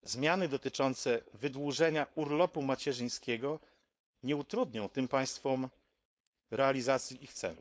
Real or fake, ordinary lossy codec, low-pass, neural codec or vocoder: fake; none; none; codec, 16 kHz, 4.8 kbps, FACodec